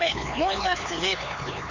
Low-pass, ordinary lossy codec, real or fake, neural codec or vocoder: 7.2 kHz; AAC, 48 kbps; fake; codec, 16 kHz, 4 kbps, X-Codec, HuBERT features, trained on LibriSpeech